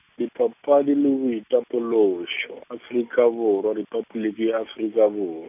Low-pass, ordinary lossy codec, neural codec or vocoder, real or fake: 3.6 kHz; none; none; real